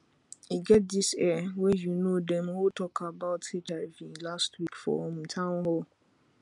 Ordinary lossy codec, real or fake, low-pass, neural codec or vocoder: none; real; 10.8 kHz; none